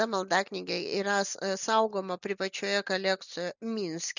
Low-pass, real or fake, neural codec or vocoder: 7.2 kHz; real; none